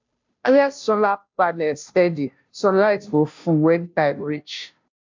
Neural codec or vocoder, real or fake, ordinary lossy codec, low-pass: codec, 16 kHz, 0.5 kbps, FunCodec, trained on Chinese and English, 25 frames a second; fake; MP3, 64 kbps; 7.2 kHz